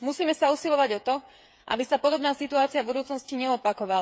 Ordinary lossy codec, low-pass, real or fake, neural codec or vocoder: none; none; fake; codec, 16 kHz, 8 kbps, FreqCodec, smaller model